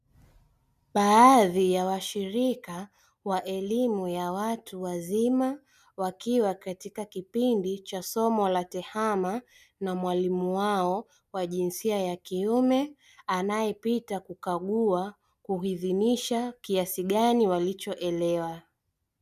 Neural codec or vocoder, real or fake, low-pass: none; real; 14.4 kHz